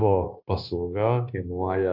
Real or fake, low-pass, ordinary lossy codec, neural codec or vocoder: fake; 5.4 kHz; AAC, 48 kbps; codec, 16 kHz, 0.9 kbps, LongCat-Audio-Codec